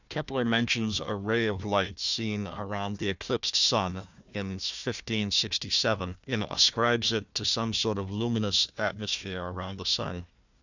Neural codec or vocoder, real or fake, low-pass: codec, 16 kHz, 1 kbps, FunCodec, trained on Chinese and English, 50 frames a second; fake; 7.2 kHz